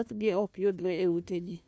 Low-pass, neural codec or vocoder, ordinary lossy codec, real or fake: none; codec, 16 kHz, 1 kbps, FunCodec, trained on Chinese and English, 50 frames a second; none; fake